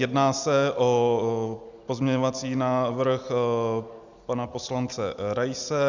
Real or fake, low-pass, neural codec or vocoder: real; 7.2 kHz; none